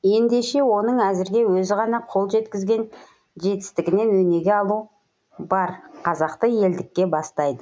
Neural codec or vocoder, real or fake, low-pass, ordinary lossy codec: none; real; none; none